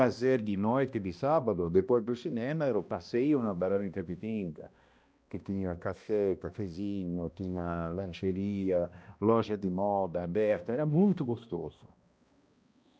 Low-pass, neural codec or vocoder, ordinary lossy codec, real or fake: none; codec, 16 kHz, 1 kbps, X-Codec, HuBERT features, trained on balanced general audio; none; fake